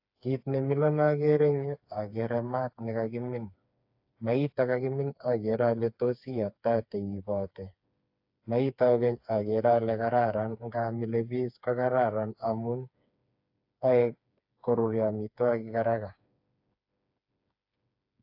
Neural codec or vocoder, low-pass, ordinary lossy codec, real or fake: codec, 16 kHz, 4 kbps, FreqCodec, smaller model; 5.4 kHz; AAC, 48 kbps; fake